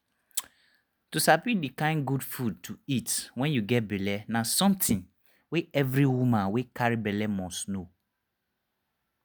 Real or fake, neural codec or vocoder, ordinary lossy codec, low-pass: real; none; none; none